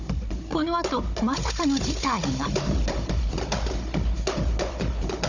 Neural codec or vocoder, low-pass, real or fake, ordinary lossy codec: codec, 16 kHz, 16 kbps, FunCodec, trained on Chinese and English, 50 frames a second; 7.2 kHz; fake; none